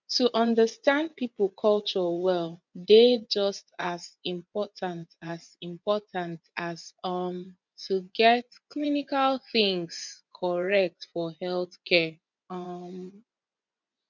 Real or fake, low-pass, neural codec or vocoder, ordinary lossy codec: fake; 7.2 kHz; vocoder, 24 kHz, 100 mel bands, Vocos; none